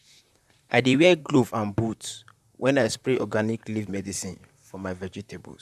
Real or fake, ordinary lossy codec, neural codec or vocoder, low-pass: fake; none; vocoder, 44.1 kHz, 128 mel bands, Pupu-Vocoder; 14.4 kHz